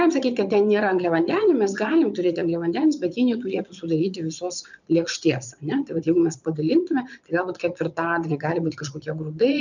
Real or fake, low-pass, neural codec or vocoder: fake; 7.2 kHz; vocoder, 22.05 kHz, 80 mel bands, Vocos